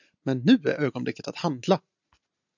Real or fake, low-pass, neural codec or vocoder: real; 7.2 kHz; none